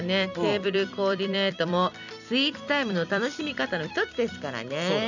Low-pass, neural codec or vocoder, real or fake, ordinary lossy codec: 7.2 kHz; vocoder, 44.1 kHz, 128 mel bands every 256 samples, BigVGAN v2; fake; none